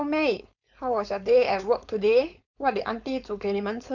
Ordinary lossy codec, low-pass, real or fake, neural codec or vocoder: none; 7.2 kHz; fake; codec, 16 kHz, 4.8 kbps, FACodec